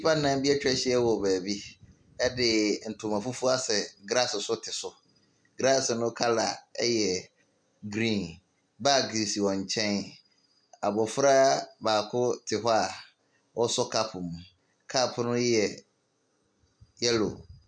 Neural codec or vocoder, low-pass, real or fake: none; 9.9 kHz; real